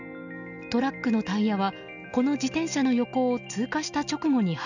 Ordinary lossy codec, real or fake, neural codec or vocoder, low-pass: none; real; none; 7.2 kHz